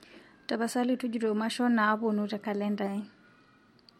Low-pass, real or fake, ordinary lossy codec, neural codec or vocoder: 19.8 kHz; real; MP3, 64 kbps; none